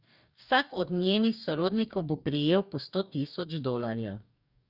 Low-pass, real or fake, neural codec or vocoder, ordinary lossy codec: 5.4 kHz; fake; codec, 44.1 kHz, 2.6 kbps, DAC; none